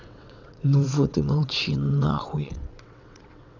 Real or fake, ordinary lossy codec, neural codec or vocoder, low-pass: fake; none; vocoder, 22.05 kHz, 80 mel bands, WaveNeXt; 7.2 kHz